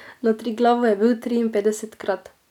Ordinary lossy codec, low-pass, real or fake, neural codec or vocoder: none; 19.8 kHz; real; none